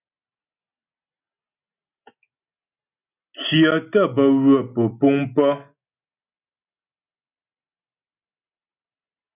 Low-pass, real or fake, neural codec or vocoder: 3.6 kHz; real; none